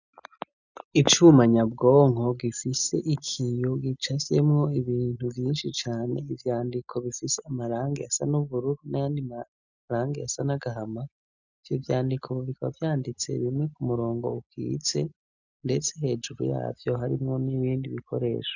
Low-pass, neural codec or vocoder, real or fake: 7.2 kHz; none; real